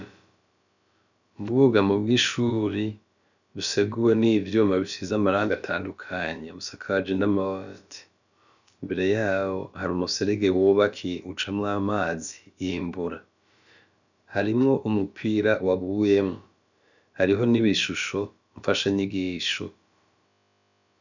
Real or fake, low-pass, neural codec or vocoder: fake; 7.2 kHz; codec, 16 kHz, about 1 kbps, DyCAST, with the encoder's durations